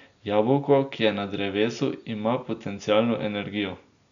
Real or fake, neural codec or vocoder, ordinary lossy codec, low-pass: real; none; none; 7.2 kHz